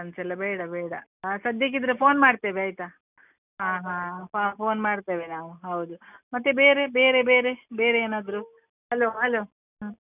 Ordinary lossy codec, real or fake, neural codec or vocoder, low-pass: none; real; none; 3.6 kHz